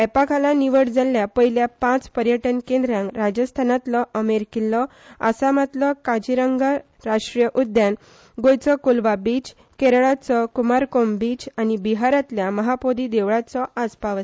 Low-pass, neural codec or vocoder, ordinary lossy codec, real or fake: none; none; none; real